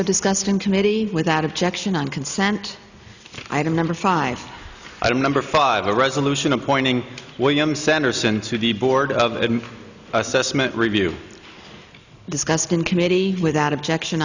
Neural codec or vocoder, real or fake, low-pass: none; real; 7.2 kHz